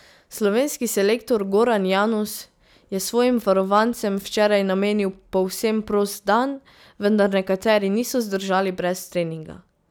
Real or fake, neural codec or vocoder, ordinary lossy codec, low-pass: real; none; none; none